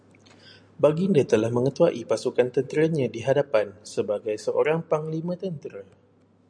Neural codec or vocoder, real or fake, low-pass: none; real; 9.9 kHz